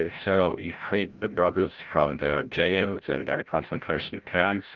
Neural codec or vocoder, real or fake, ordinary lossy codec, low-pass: codec, 16 kHz, 0.5 kbps, FreqCodec, larger model; fake; Opus, 32 kbps; 7.2 kHz